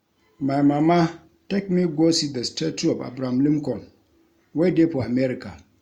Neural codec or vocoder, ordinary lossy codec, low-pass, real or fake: none; Opus, 64 kbps; 19.8 kHz; real